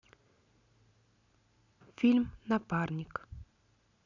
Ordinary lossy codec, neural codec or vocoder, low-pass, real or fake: none; none; 7.2 kHz; real